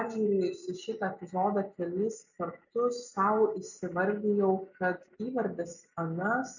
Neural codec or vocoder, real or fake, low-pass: none; real; 7.2 kHz